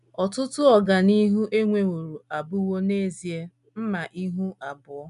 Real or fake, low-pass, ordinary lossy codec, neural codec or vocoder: real; 10.8 kHz; none; none